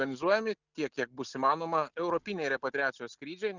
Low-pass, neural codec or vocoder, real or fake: 7.2 kHz; none; real